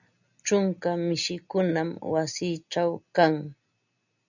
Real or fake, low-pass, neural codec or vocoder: real; 7.2 kHz; none